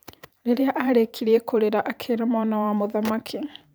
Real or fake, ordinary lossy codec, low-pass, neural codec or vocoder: fake; none; none; vocoder, 44.1 kHz, 128 mel bands every 512 samples, BigVGAN v2